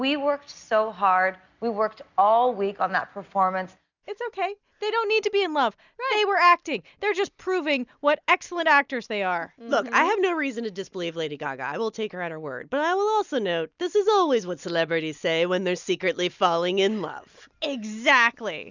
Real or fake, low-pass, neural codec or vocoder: real; 7.2 kHz; none